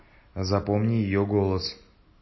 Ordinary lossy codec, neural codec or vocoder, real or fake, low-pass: MP3, 24 kbps; none; real; 7.2 kHz